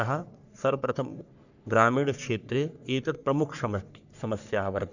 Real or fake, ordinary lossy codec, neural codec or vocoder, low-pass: fake; none; codec, 44.1 kHz, 3.4 kbps, Pupu-Codec; 7.2 kHz